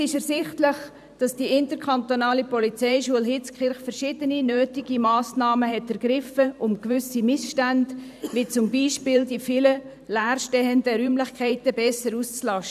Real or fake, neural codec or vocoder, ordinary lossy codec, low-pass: fake; vocoder, 44.1 kHz, 128 mel bands every 512 samples, BigVGAN v2; none; 14.4 kHz